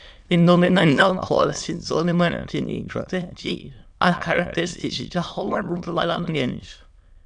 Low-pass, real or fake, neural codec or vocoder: 9.9 kHz; fake; autoencoder, 22.05 kHz, a latent of 192 numbers a frame, VITS, trained on many speakers